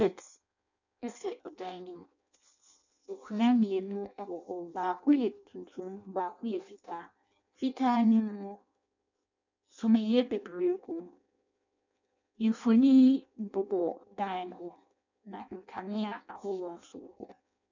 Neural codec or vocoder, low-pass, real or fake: codec, 16 kHz in and 24 kHz out, 0.6 kbps, FireRedTTS-2 codec; 7.2 kHz; fake